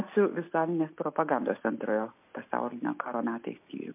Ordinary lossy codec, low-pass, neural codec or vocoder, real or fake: MP3, 32 kbps; 3.6 kHz; none; real